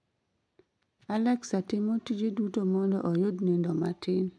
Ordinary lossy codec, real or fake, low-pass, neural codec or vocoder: none; real; none; none